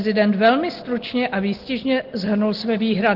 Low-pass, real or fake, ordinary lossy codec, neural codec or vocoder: 5.4 kHz; real; Opus, 16 kbps; none